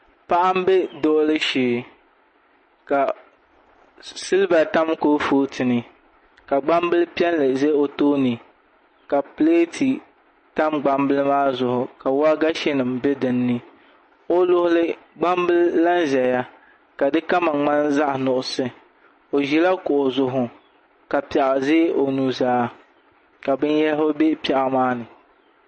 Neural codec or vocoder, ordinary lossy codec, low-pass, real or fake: none; MP3, 32 kbps; 10.8 kHz; real